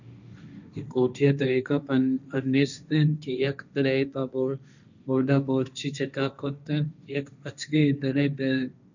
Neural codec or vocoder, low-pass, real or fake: codec, 16 kHz, 1.1 kbps, Voila-Tokenizer; 7.2 kHz; fake